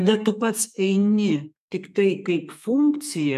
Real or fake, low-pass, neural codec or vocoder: fake; 14.4 kHz; codec, 32 kHz, 1.9 kbps, SNAC